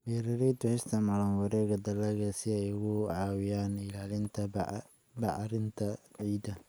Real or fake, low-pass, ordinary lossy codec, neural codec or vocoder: real; none; none; none